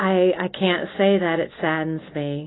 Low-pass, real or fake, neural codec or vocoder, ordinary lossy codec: 7.2 kHz; real; none; AAC, 16 kbps